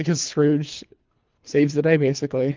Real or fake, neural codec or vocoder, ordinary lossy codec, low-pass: fake; codec, 24 kHz, 3 kbps, HILCodec; Opus, 24 kbps; 7.2 kHz